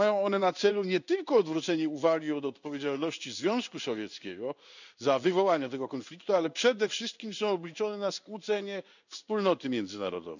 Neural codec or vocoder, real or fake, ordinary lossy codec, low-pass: codec, 16 kHz in and 24 kHz out, 1 kbps, XY-Tokenizer; fake; none; 7.2 kHz